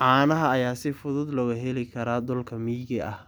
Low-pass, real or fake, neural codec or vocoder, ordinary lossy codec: none; real; none; none